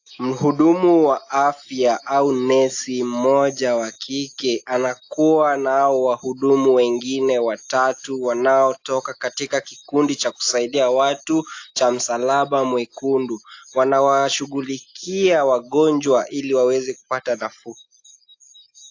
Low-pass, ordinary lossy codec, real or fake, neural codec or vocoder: 7.2 kHz; AAC, 48 kbps; real; none